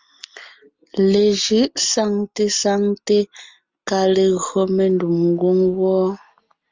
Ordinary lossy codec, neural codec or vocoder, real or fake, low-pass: Opus, 32 kbps; none; real; 7.2 kHz